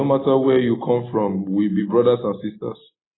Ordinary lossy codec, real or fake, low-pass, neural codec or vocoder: AAC, 16 kbps; real; 7.2 kHz; none